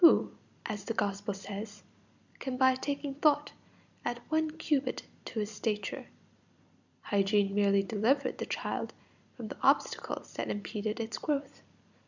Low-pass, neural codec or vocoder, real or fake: 7.2 kHz; vocoder, 44.1 kHz, 128 mel bands every 256 samples, BigVGAN v2; fake